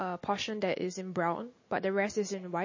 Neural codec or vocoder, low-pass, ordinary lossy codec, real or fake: none; 7.2 kHz; MP3, 32 kbps; real